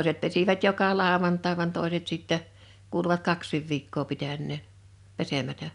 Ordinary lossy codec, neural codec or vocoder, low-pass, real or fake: none; none; 10.8 kHz; real